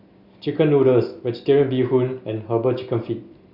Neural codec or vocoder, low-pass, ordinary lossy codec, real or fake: none; 5.4 kHz; Opus, 64 kbps; real